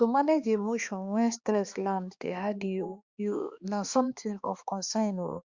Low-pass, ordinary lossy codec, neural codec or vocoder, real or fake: 7.2 kHz; Opus, 64 kbps; codec, 16 kHz, 2 kbps, X-Codec, HuBERT features, trained on balanced general audio; fake